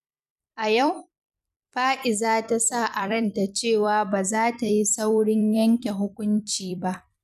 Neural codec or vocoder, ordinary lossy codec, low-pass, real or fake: vocoder, 44.1 kHz, 128 mel bands every 256 samples, BigVGAN v2; none; 14.4 kHz; fake